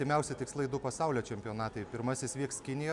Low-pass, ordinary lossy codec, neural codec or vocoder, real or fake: 10.8 kHz; MP3, 96 kbps; none; real